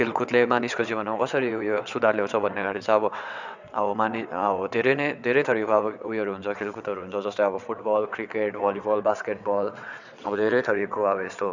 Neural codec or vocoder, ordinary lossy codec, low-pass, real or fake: vocoder, 22.05 kHz, 80 mel bands, WaveNeXt; none; 7.2 kHz; fake